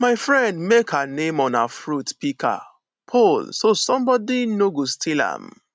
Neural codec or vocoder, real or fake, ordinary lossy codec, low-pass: none; real; none; none